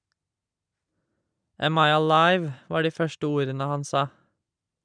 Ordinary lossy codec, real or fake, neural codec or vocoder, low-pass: none; real; none; 9.9 kHz